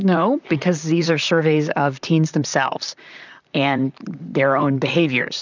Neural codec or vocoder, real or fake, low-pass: vocoder, 44.1 kHz, 128 mel bands, Pupu-Vocoder; fake; 7.2 kHz